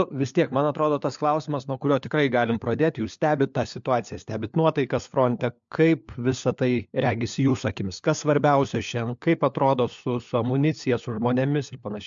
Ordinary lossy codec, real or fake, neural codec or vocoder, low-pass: MP3, 64 kbps; fake; codec, 16 kHz, 4 kbps, FunCodec, trained on LibriTTS, 50 frames a second; 7.2 kHz